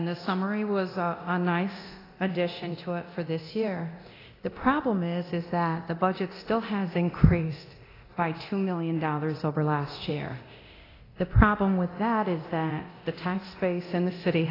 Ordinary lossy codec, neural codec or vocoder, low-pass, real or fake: AAC, 24 kbps; codec, 24 kHz, 0.9 kbps, DualCodec; 5.4 kHz; fake